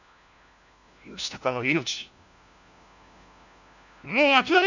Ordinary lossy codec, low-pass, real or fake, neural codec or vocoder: none; 7.2 kHz; fake; codec, 16 kHz, 1 kbps, FunCodec, trained on LibriTTS, 50 frames a second